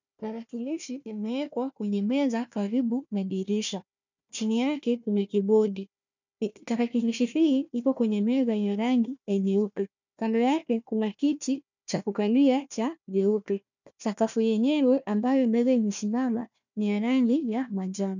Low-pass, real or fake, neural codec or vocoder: 7.2 kHz; fake; codec, 16 kHz, 1 kbps, FunCodec, trained on Chinese and English, 50 frames a second